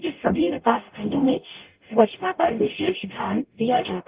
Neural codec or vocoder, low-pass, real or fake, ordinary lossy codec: codec, 44.1 kHz, 0.9 kbps, DAC; 3.6 kHz; fake; Opus, 32 kbps